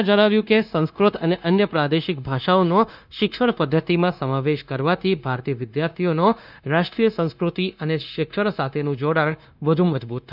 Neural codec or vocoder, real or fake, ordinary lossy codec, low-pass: codec, 16 kHz, 0.9 kbps, LongCat-Audio-Codec; fake; none; 5.4 kHz